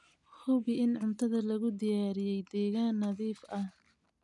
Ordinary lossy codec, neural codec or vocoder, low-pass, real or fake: none; none; 10.8 kHz; real